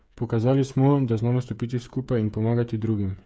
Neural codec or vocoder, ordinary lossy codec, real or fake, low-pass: codec, 16 kHz, 8 kbps, FreqCodec, smaller model; none; fake; none